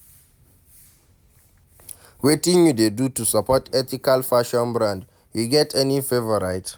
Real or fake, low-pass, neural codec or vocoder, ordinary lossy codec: real; none; none; none